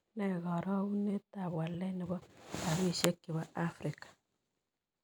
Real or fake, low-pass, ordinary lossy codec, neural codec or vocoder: real; none; none; none